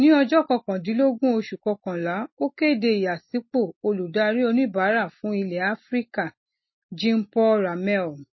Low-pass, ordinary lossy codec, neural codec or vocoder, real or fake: 7.2 kHz; MP3, 24 kbps; none; real